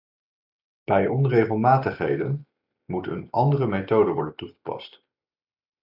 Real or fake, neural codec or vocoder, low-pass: real; none; 5.4 kHz